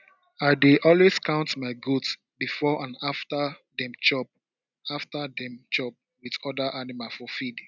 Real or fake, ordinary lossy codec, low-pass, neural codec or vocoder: real; none; 7.2 kHz; none